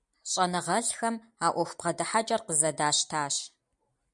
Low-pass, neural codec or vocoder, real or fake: 10.8 kHz; none; real